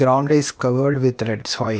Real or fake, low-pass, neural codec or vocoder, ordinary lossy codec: fake; none; codec, 16 kHz, 0.8 kbps, ZipCodec; none